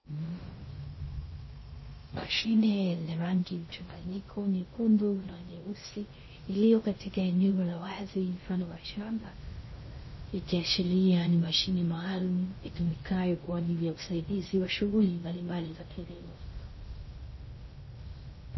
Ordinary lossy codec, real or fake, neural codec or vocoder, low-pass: MP3, 24 kbps; fake; codec, 16 kHz in and 24 kHz out, 0.6 kbps, FocalCodec, streaming, 2048 codes; 7.2 kHz